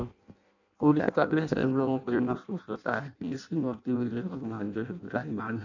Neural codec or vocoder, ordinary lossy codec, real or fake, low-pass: codec, 16 kHz in and 24 kHz out, 0.6 kbps, FireRedTTS-2 codec; none; fake; 7.2 kHz